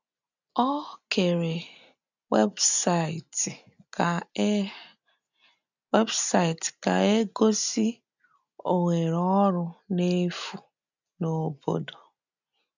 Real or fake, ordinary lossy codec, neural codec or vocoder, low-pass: real; none; none; 7.2 kHz